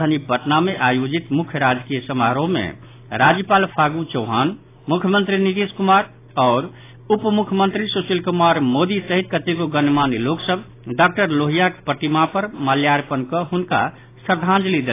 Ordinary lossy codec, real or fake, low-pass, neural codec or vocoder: AAC, 24 kbps; real; 3.6 kHz; none